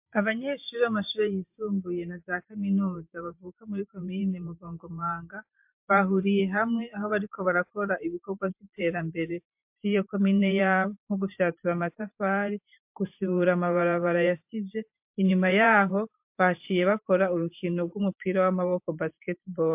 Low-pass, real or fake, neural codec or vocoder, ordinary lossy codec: 3.6 kHz; fake; vocoder, 44.1 kHz, 128 mel bands every 512 samples, BigVGAN v2; MP3, 32 kbps